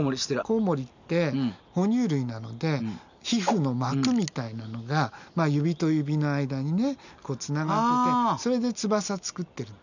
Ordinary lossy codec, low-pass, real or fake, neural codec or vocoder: none; 7.2 kHz; real; none